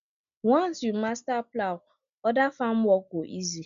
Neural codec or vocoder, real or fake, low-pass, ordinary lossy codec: none; real; 7.2 kHz; none